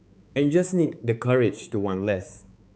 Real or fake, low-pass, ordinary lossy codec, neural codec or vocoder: fake; none; none; codec, 16 kHz, 4 kbps, X-Codec, HuBERT features, trained on balanced general audio